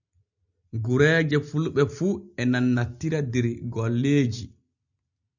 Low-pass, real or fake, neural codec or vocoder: 7.2 kHz; real; none